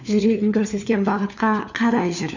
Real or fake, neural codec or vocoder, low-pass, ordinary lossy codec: fake; codec, 16 kHz, 4 kbps, FunCodec, trained on LibriTTS, 50 frames a second; 7.2 kHz; none